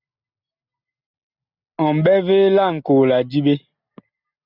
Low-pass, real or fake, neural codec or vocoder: 5.4 kHz; real; none